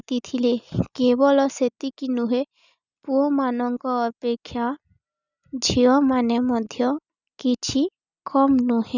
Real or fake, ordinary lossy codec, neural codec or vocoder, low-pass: real; none; none; 7.2 kHz